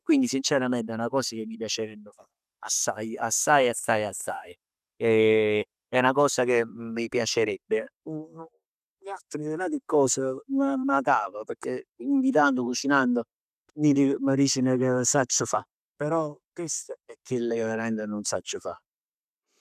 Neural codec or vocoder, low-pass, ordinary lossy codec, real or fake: none; 14.4 kHz; none; real